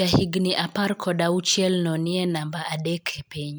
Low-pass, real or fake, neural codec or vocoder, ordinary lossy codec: none; real; none; none